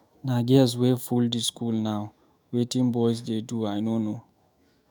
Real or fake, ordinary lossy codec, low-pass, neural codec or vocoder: fake; none; none; autoencoder, 48 kHz, 128 numbers a frame, DAC-VAE, trained on Japanese speech